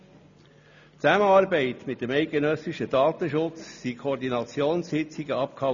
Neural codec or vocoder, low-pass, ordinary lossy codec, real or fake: none; 7.2 kHz; none; real